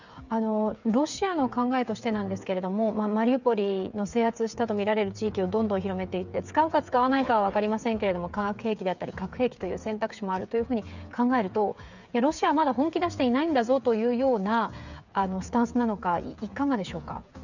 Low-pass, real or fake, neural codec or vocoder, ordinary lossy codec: 7.2 kHz; fake; codec, 16 kHz, 8 kbps, FreqCodec, smaller model; none